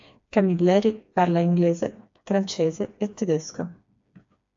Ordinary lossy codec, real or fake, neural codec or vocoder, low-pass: AAC, 64 kbps; fake; codec, 16 kHz, 2 kbps, FreqCodec, smaller model; 7.2 kHz